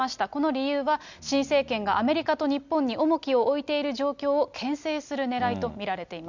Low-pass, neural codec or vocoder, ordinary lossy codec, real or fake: 7.2 kHz; none; none; real